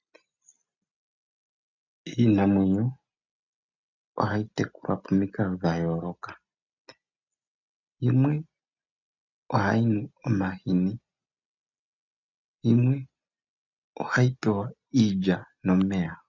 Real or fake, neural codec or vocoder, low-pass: real; none; 7.2 kHz